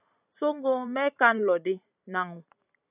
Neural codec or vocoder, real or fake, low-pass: vocoder, 44.1 kHz, 128 mel bands every 512 samples, BigVGAN v2; fake; 3.6 kHz